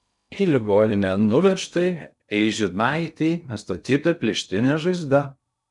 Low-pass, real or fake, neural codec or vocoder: 10.8 kHz; fake; codec, 16 kHz in and 24 kHz out, 0.6 kbps, FocalCodec, streaming, 2048 codes